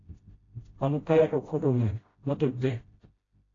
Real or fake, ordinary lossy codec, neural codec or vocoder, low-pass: fake; AAC, 32 kbps; codec, 16 kHz, 0.5 kbps, FreqCodec, smaller model; 7.2 kHz